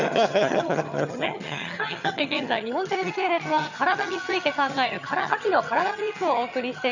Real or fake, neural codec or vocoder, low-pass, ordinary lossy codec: fake; vocoder, 22.05 kHz, 80 mel bands, HiFi-GAN; 7.2 kHz; none